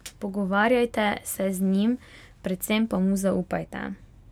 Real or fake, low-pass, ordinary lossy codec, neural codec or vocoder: real; 19.8 kHz; none; none